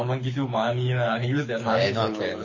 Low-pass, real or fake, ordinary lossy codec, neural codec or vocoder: 7.2 kHz; fake; MP3, 32 kbps; codec, 24 kHz, 6 kbps, HILCodec